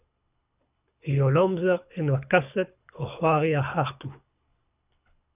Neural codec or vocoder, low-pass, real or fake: codec, 24 kHz, 6 kbps, HILCodec; 3.6 kHz; fake